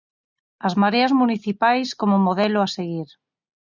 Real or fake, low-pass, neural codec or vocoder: real; 7.2 kHz; none